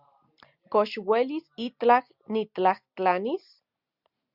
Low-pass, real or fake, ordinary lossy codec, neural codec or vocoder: 5.4 kHz; real; Opus, 64 kbps; none